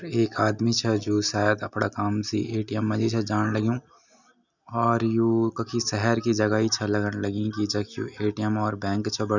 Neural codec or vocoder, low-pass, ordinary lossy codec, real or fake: none; 7.2 kHz; none; real